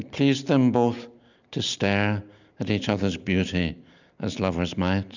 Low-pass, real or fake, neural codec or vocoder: 7.2 kHz; real; none